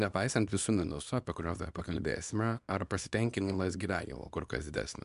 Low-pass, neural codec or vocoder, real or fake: 10.8 kHz; codec, 24 kHz, 0.9 kbps, WavTokenizer, small release; fake